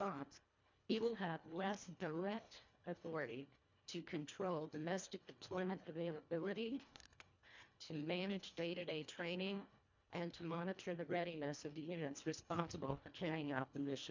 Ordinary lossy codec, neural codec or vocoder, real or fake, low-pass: MP3, 64 kbps; codec, 24 kHz, 1.5 kbps, HILCodec; fake; 7.2 kHz